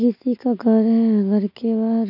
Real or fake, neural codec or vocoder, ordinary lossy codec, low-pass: real; none; none; 5.4 kHz